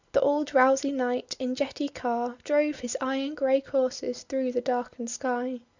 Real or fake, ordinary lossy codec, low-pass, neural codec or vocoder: real; Opus, 64 kbps; 7.2 kHz; none